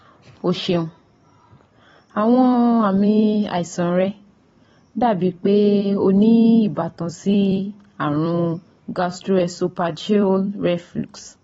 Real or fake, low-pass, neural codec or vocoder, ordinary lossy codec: fake; 19.8 kHz; vocoder, 44.1 kHz, 128 mel bands every 256 samples, BigVGAN v2; AAC, 24 kbps